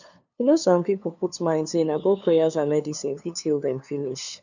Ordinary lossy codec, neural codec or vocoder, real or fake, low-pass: none; codec, 16 kHz, 2 kbps, FunCodec, trained on LibriTTS, 25 frames a second; fake; 7.2 kHz